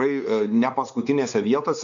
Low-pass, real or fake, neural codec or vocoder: 7.2 kHz; fake; codec, 16 kHz, 4 kbps, X-Codec, WavLM features, trained on Multilingual LibriSpeech